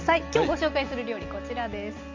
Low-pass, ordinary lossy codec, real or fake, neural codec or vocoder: 7.2 kHz; MP3, 48 kbps; real; none